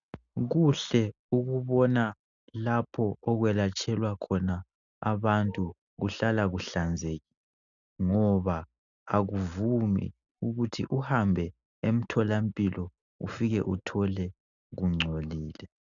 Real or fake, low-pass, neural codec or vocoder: real; 7.2 kHz; none